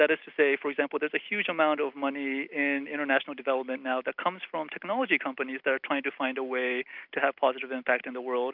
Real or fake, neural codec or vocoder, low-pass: real; none; 5.4 kHz